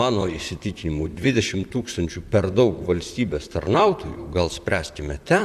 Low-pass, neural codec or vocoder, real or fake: 14.4 kHz; vocoder, 44.1 kHz, 128 mel bands, Pupu-Vocoder; fake